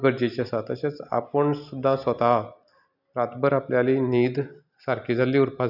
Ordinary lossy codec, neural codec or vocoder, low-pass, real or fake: none; none; 5.4 kHz; real